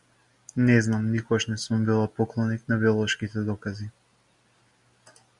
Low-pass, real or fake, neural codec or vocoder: 10.8 kHz; real; none